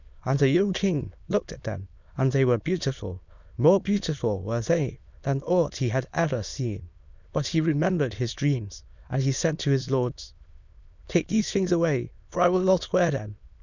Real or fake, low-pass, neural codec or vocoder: fake; 7.2 kHz; autoencoder, 22.05 kHz, a latent of 192 numbers a frame, VITS, trained on many speakers